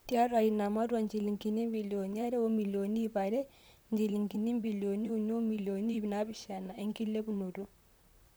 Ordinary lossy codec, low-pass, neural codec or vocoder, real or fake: none; none; vocoder, 44.1 kHz, 128 mel bands, Pupu-Vocoder; fake